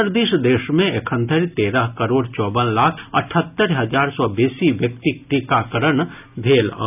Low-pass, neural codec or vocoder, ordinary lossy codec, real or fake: 3.6 kHz; none; none; real